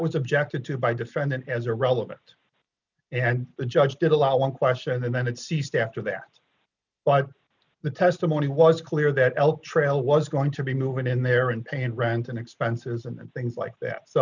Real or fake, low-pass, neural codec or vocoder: real; 7.2 kHz; none